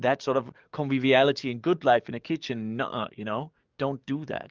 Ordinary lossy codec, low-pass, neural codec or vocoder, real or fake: Opus, 24 kbps; 7.2 kHz; codec, 44.1 kHz, 7.8 kbps, DAC; fake